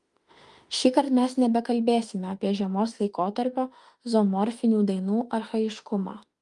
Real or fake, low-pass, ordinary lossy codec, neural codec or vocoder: fake; 10.8 kHz; Opus, 32 kbps; autoencoder, 48 kHz, 32 numbers a frame, DAC-VAE, trained on Japanese speech